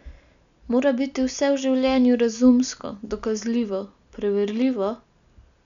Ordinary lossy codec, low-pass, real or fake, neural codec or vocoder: none; 7.2 kHz; real; none